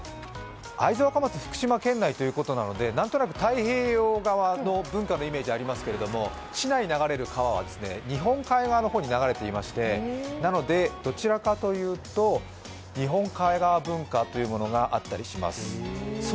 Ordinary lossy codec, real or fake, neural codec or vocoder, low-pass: none; real; none; none